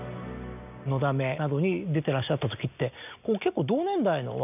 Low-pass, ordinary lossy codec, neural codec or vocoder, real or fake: 3.6 kHz; none; none; real